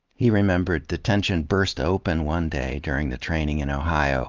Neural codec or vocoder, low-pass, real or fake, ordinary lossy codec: none; 7.2 kHz; real; Opus, 32 kbps